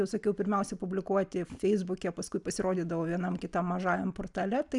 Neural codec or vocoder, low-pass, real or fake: none; 10.8 kHz; real